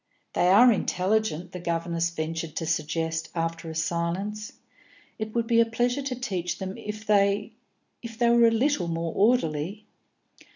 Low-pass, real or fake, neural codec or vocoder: 7.2 kHz; real; none